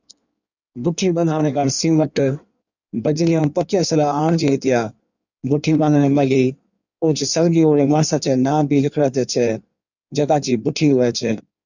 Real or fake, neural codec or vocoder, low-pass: fake; codec, 16 kHz in and 24 kHz out, 1.1 kbps, FireRedTTS-2 codec; 7.2 kHz